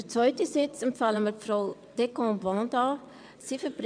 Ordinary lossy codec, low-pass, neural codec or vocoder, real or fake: none; 9.9 kHz; vocoder, 22.05 kHz, 80 mel bands, WaveNeXt; fake